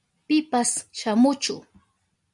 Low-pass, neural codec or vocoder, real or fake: 10.8 kHz; none; real